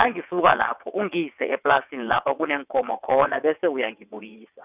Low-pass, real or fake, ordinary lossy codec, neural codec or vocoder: 3.6 kHz; fake; none; vocoder, 22.05 kHz, 80 mel bands, WaveNeXt